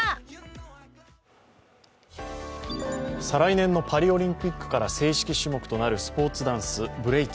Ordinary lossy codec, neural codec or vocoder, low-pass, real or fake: none; none; none; real